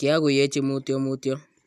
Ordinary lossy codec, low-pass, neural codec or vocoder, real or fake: none; 14.4 kHz; none; real